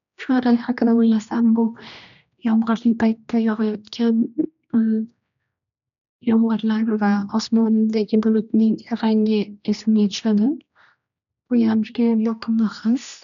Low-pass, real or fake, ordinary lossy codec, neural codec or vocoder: 7.2 kHz; fake; none; codec, 16 kHz, 1 kbps, X-Codec, HuBERT features, trained on general audio